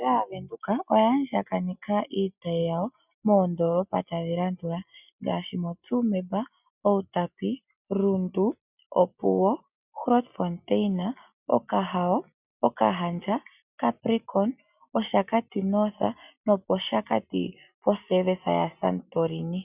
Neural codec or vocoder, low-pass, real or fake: none; 3.6 kHz; real